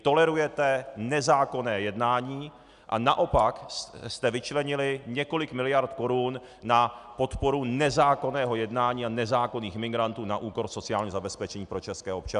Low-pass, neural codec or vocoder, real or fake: 10.8 kHz; none; real